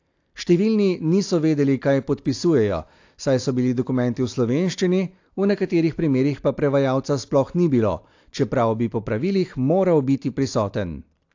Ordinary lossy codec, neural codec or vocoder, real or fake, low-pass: AAC, 48 kbps; none; real; 7.2 kHz